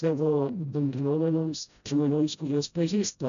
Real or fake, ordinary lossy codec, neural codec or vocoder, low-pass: fake; AAC, 96 kbps; codec, 16 kHz, 0.5 kbps, FreqCodec, smaller model; 7.2 kHz